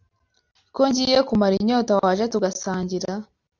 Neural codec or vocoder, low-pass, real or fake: none; 7.2 kHz; real